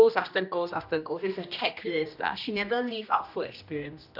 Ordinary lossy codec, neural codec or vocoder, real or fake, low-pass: none; codec, 16 kHz, 1 kbps, X-Codec, HuBERT features, trained on general audio; fake; 5.4 kHz